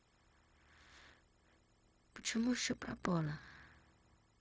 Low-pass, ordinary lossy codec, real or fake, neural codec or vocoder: none; none; fake; codec, 16 kHz, 0.4 kbps, LongCat-Audio-Codec